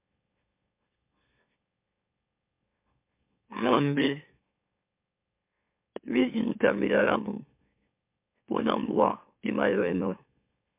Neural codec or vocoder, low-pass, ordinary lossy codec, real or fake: autoencoder, 44.1 kHz, a latent of 192 numbers a frame, MeloTTS; 3.6 kHz; MP3, 32 kbps; fake